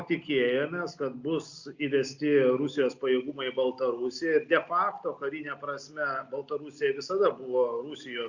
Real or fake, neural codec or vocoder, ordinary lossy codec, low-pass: real; none; Opus, 64 kbps; 7.2 kHz